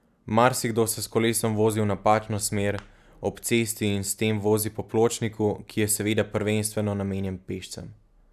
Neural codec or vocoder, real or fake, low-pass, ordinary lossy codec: none; real; 14.4 kHz; none